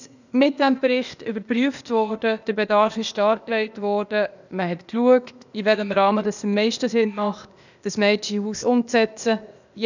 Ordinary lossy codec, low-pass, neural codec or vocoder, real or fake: none; 7.2 kHz; codec, 16 kHz, 0.8 kbps, ZipCodec; fake